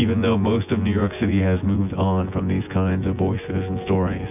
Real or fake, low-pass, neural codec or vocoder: fake; 3.6 kHz; vocoder, 24 kHz, 100 mel bands, Vocos